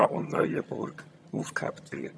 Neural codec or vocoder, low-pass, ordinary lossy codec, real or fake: vocoder, 22.05 kHz, 80 mel bands, HiFi-GAN; none; none; fake